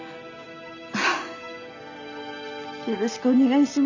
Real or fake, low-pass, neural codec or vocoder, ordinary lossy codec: real; 7.2 kHz; none; none